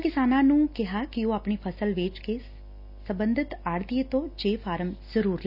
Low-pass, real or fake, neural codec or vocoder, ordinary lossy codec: 5.4 kHz; real; none; none